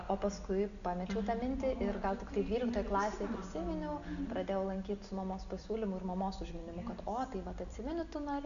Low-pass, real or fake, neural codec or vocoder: 7.2 kHz; real; none